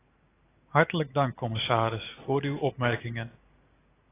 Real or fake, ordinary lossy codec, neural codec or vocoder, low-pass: real; AAC, 16 kbps; none; 3.6 kHz